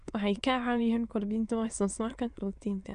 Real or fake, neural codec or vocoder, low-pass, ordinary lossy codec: fake; autoencoder, 22.05 kHz, a latent of 192 numbers a frame, VITS, trained on many speakers; 9.9 kHz; none